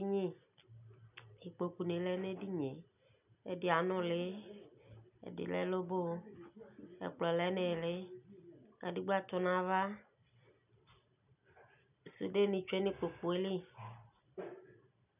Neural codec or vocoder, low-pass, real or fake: none; 3.6 kHz; real